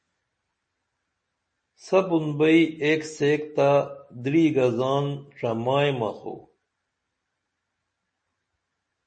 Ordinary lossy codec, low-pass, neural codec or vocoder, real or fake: MP3, 32 kbps; 9.9 kHz; none; real